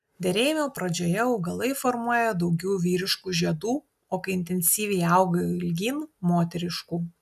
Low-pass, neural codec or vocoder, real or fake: 14.4 kHz; none; real